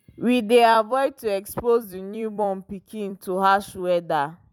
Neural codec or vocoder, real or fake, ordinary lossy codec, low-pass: vocoder, 44.1 kHz, 128 mel bands every 256 samples, BigVGAN v2; fake; none; 19.8 kHz